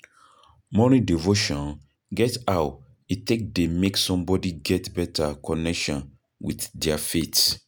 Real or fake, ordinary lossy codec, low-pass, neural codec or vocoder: real; none; none; none